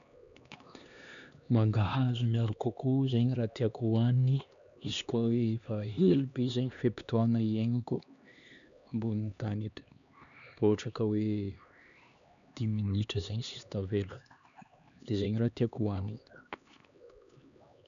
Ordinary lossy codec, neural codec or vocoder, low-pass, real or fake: none; codec, 16 kHz, 2 kbps, X-Codec, HuBERT features, trained on LibriSpeech; 7.2 kHz; fake